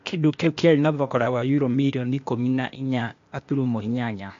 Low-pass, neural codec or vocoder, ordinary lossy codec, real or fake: 7.2 kHz; codec, 16 kHz, 0.8 kbps, ZipCodec; MP3, 48 kbps; fake